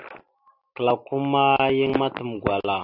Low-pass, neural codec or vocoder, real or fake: 5.4 kHz; none; real